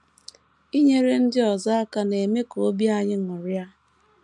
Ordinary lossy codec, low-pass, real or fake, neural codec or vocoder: none; none; real; none